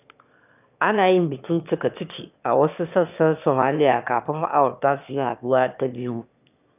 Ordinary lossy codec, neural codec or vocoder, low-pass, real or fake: none; autoencoder, 22.05 kHz, a latent of 192 numbers a frame, VITS, trained on one speaker; 3.6 kHz; fake